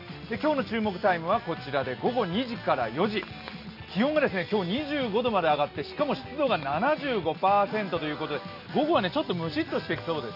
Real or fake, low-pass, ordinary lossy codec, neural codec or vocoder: real; 5.4 kHz; none; none